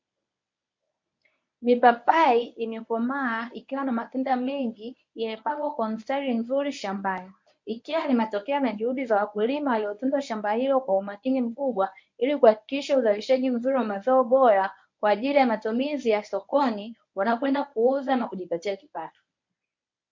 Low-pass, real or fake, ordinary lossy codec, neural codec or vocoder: 7.2 kHz; fake; MP3, 48 kbps; codec, 24 kHz, 0.9 kbps, WavTokenizer, medium speech release version 1